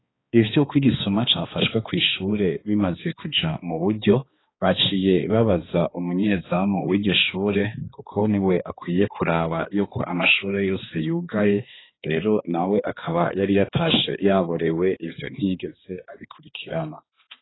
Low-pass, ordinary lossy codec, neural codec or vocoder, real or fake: 7.2 kHz; AAC, 16 kbps; codec, 16 kHz, 2 kbps, X-Codec, HuBERT features, trained on balanced general audio; fake